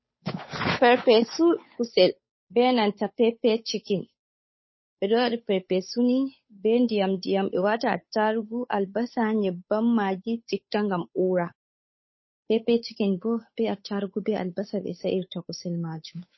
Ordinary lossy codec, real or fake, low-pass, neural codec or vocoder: MP3, 24 kbps; fake; 7.2 kHz; codec, 16 kHz, 8 kbps, FunCodec, trained on Chinese and English, 25 frames a second